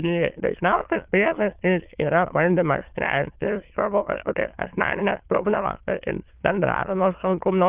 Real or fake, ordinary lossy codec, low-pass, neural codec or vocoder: fake; Opus, 32 kbps; 3.6 kHz; autoencoder, 22.05 kHz, a latent of 192 numbers a frame, VITS, trained on many speakers